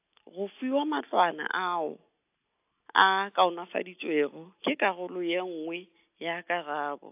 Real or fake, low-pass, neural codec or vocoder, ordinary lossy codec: real; 3.6 kHz; none; AAC, 32 kbps